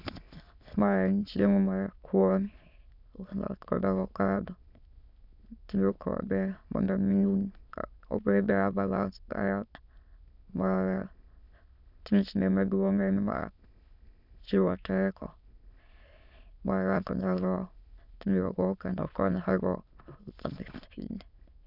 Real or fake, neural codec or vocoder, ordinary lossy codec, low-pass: fake; autoencoder, 22.05 kHz, a latent of 192 numbers a frame, VITS, trained on many speakers; none; 5.4 kHz